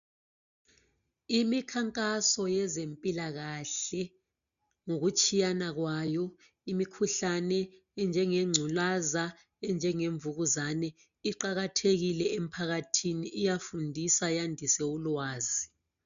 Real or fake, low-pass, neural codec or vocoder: real; 7.2 kHz; none